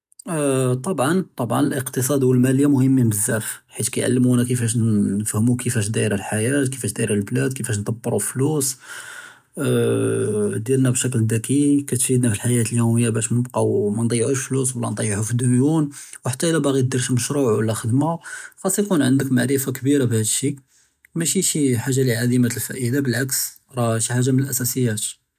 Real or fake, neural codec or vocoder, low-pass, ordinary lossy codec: real; none; 14.4 kHz; none